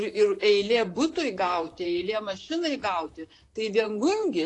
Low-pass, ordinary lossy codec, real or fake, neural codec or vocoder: 10.8 kHz; AAC, 48 kbps; fake; vocoder, 44.1 kHz, 128 mel bands, Pupu-Vocoder